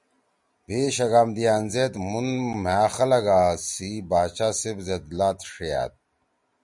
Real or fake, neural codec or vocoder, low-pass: real; none; 10.8 kHz